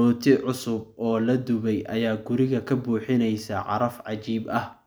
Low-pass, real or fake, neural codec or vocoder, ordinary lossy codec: none; real; none; none